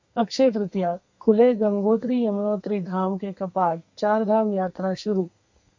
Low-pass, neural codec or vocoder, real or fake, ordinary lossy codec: 7.2 kHz; codec, 32 kHz, 1.9 kbps, SNAC; fake; MP3, 64 kbps